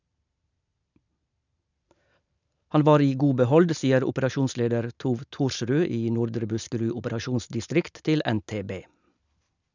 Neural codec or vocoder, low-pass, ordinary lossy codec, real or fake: none; 7.2 kHz; none; real